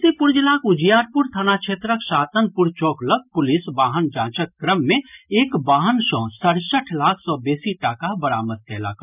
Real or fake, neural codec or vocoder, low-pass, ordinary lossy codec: real; none; 3.6 kHz; none